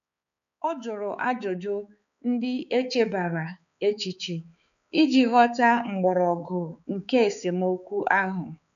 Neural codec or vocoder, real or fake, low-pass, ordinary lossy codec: codec, 16 kHz, 4 kbps, X-Codec, HuBERT features, trained on balanced general audio; fake; 7.2 kHz; none